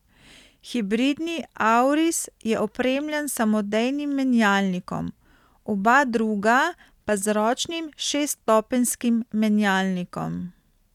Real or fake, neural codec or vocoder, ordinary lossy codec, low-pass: real; none; none; 19.8 kHz